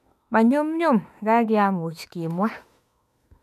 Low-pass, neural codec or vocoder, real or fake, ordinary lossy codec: 14.4 kHz; autoencoder, 48 kHz, 32 numbers a frame, DAC-VAE, trained on Japanese speech; fake; none